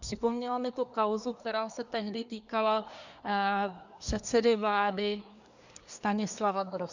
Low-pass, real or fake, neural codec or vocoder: 7.2 kHz; fake; codec, 24 kHz, 1 kbps, SNAC